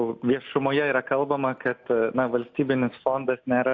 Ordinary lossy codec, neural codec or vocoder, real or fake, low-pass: AAC, 48 kbps; none; real; 7.2 kHz